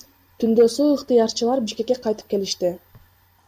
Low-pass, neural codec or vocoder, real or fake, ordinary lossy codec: 14.4 kHz; none; real; MP3, 96 kbps